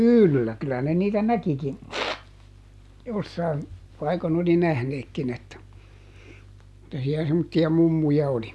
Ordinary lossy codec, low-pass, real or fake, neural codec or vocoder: none; none; real; none